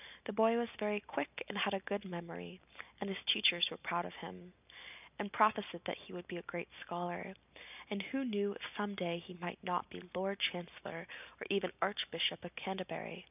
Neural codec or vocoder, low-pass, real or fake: none; 3.6 kHz; real